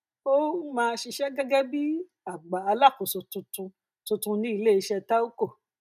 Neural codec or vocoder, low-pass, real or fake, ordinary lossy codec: none; 14.4 kHz; real; none